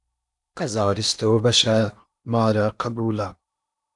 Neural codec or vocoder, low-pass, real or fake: codec, 16 kHz in and 24 kHz out, 0.8 kbps, FocalCodec, streaming, 65536 codes; 10.8 kHz; fake